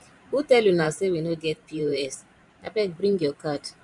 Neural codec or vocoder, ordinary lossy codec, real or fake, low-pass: vocoder, 44.1 kHz, 128 mel bands every 512 samples, BigVGAN v2; none; fake; 10.8 kHz